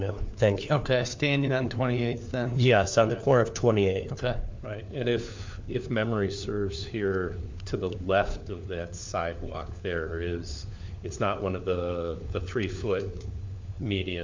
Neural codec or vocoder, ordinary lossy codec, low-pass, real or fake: codec, 16 kHz, 4 kbps, FunCodec, trained on LibriTTS, 50 frames a second; MP3, 64 kbps; 7.2 kHz; fake